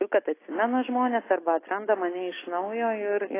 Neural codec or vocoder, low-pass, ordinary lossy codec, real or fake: none; 3.6 kHz; AAC, 16 kbps; real